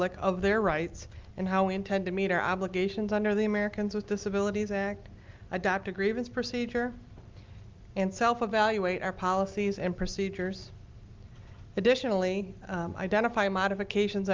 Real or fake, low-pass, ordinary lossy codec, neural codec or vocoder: real; 7.2 kHz; Opus, 32 kbps; none